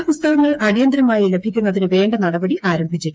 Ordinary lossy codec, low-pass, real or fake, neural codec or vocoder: none; none; fake; codec, 16 kHz, 4 kbps, FreqCodec, smaller model